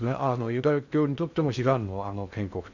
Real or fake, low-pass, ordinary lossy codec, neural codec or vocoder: fake; 7.2 kHz; none; codec, 16 kHz in and 24 kHz out, 0.6 kbps, FocalCodec, streaming, 2048 codes